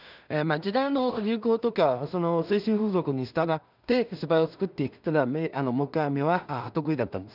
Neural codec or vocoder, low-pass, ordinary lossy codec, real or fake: codec, 16 kHz in and 24 kHz out, 0.4 kbps, LongCat-Audio-Codec, two codebook decoder; 5.4 kHz; none; fake